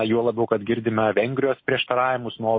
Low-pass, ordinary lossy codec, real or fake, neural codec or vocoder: 7.2 kHz; MP3, 24 kbps; real; none